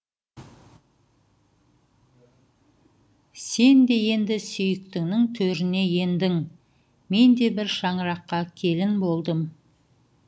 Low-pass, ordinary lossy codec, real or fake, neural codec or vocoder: none; none; real; none